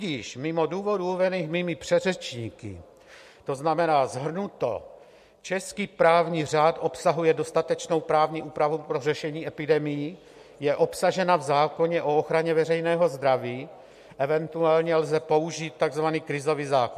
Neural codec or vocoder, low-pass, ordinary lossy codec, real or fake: none; 14.4 kHz; MP3, 64 kbps; real